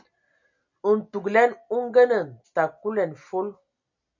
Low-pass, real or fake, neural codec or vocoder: 7.2 kHz; real; none